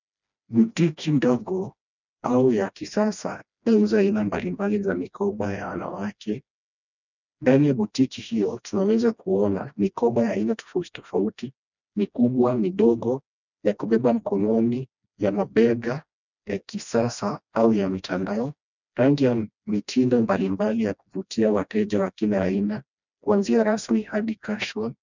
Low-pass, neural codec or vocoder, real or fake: 7.2 kHz; codec, 16 kHz, 1 kbps, FreqCodec, smaller model; fake